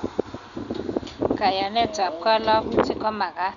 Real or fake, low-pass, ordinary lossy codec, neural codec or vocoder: real; 7.2 kHz; none; none